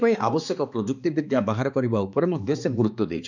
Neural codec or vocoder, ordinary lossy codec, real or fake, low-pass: codec, 16 kHz, 2 kbps, X-Codec, HuBERT features, trained on balanced general audio; none; fake; 7.2 kHz